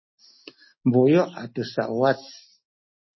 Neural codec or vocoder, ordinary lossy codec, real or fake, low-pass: none; MP3, 24 kbps; real; 7.2 kHz